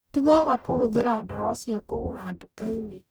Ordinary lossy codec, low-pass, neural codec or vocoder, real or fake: none; none; codec, 44.1 kHz, 0.9 kbps, DAC; fake